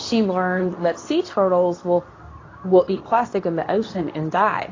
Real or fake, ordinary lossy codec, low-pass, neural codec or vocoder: fake; AAC, 32 kbps; 7.2 kHz; codec, 24 kHz, 0.9 kbps, WavTokenizer, medium speech release version 2